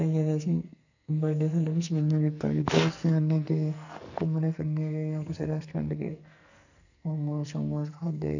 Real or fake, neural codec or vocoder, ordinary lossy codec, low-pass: fake; codec, 44.1 kHz, 2.6 kbps, SNAC; none; 7.2 kHz